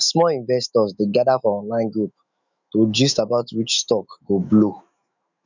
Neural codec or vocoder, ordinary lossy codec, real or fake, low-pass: autoencoder, 48 kHz, 128 numbers a frame, DAC-VAE, trained on Japanese speech; none; fake; 7.2 kHz